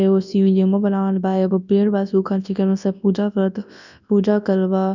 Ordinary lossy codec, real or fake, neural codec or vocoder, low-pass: none; fake; codec, 24 kHz, 0.9 kbps, WavTokenizer, large speech release; 7.2 kHz